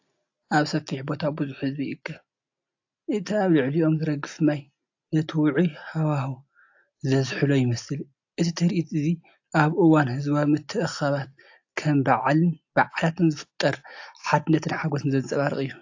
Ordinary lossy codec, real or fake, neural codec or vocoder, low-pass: AAC, 48 kbps; real; none; 7.2 kHz